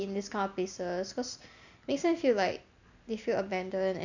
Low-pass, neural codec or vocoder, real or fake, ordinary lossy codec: 7.2 kHz; none; real; none